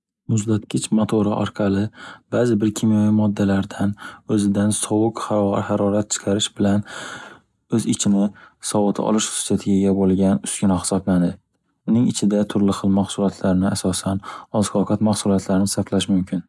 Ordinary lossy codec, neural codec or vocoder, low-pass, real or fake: none; none; none; real